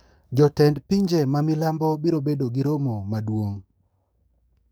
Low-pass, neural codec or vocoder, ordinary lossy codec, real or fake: none; codec, 44.1 kHz, 7.8 kbps, DAC; none; fake